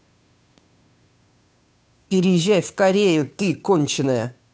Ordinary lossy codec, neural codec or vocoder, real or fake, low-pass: none; codec, 16 kHz, 2 kbps, FunCodec, trained on Chinese and English, 25 frames a second; fake; none